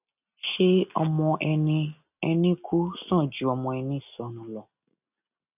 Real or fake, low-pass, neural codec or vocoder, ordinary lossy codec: real; 3.6 kHz; none; none